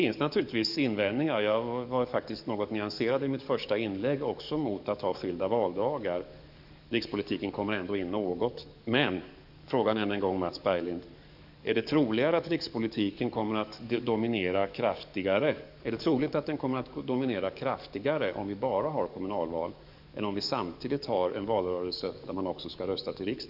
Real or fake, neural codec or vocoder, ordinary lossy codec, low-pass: fake; codec, 44.1 kHz, 7.8 kbps, DAC; none; 5.4 kHz